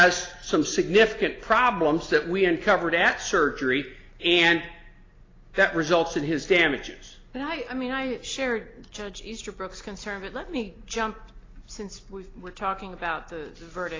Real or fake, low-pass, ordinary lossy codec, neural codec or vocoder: real; 7.2 kHz; AAC, 32 kbps; none